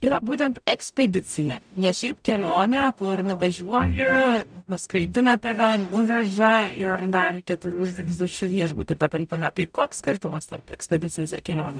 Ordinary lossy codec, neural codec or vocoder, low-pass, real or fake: MP3, 96 kbps; codec, 44.1 kHz, 0.9 kbps, DAC; 9.9 kHz; fake